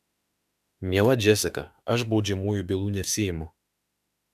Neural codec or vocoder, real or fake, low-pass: autoencoder, 48 kHz, 32 numbers a frame, DAC-VAE, trained on Japanese speech; fake; 14.4 kHz